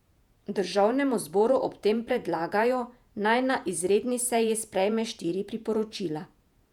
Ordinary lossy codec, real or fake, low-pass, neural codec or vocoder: none; fake; 19.8 kHz; vocoder, 48 kHz, 128 mel bands, Vocos